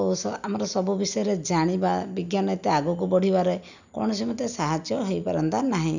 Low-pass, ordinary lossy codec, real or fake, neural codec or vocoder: 7.2 kHz; none; real; none